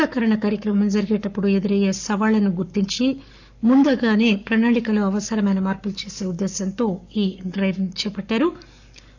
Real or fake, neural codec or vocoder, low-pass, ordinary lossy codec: fake; codec, 44.1 kHz, 7.8 kbps, Pupu-Codec; 7.2 kHz; none